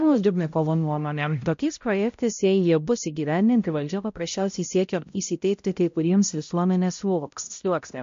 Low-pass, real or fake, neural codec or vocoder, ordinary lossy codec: 7.2 kHz; fake; codec, 16 kHz, 0.5 kbps, X-Codec, HuBERT features, trained on balanced general audio; MP3, 48 kbps